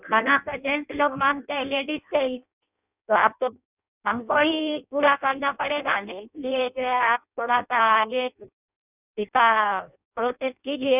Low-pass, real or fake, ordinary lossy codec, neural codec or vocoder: 3.6 kHz; fake; none; codec, 16 kHz in and 24 kHz out, 0.6 kbps, FireRedTTS-2 codec